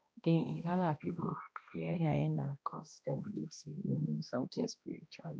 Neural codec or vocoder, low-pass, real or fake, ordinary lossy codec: codec, 16 kHz, 1 kbps, X-Codec, HuBERT features, trained on balanced general audio; none; fake; none